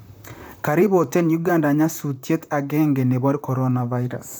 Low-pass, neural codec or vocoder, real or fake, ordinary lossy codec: none; vocoder, 44.1 kHz, 128 mel bands, Pupu-Vocoder; fake; none